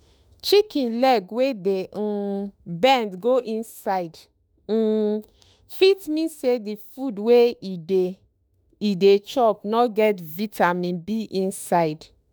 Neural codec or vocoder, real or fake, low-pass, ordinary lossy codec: autoencoder, 48 kHz, 32 numbers a frame, DAC-VAE, trained on Japanese speech; fake; none; none